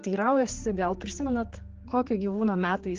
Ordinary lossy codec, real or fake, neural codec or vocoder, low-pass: Opus, 16 kbps; fake; codec, 16 kHz, 4 kbps, X-Codec, HuBERT features, trained on general audio; 7.2 kHz